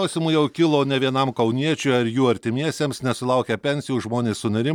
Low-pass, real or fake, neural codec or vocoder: 19.8 kHz; real; none